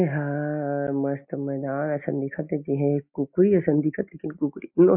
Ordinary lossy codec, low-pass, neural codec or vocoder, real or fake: MP3, 32 kbps; 3.6 kHz; none; real